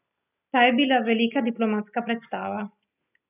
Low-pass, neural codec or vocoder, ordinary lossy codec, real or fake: 3.6 kHz; none; none; real